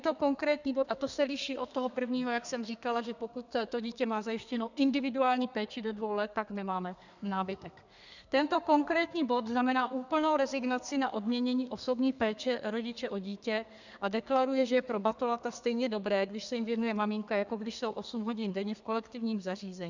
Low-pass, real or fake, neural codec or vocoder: 7.2 kHz; fake; codec, 32 kHz, 1.9 kbps, SNAC